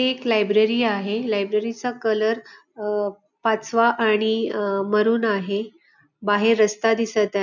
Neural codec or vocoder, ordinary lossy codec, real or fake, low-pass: none; none; real; 7.2 kHz